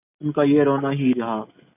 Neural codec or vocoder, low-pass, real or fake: none; 3.6 kHz; real